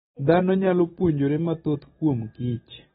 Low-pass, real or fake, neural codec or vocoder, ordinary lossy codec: 9.9 kHz; real; none; AAC, 16 kbps